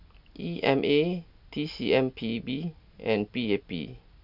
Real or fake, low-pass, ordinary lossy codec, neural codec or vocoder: real; 5.4 kHz; none; none